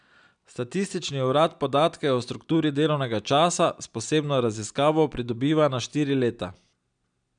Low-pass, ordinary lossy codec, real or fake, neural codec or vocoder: 9.9 kHz; none; real; none